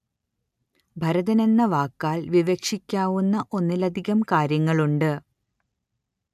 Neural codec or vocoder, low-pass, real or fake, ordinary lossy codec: none; 14.4 kHz; real; none